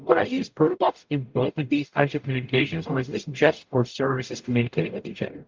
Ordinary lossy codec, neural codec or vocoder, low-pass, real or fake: Opus, 24 kbps; codec, 44.1 kHz, 0.9 kbps, DAC; 7.2 kHz; fake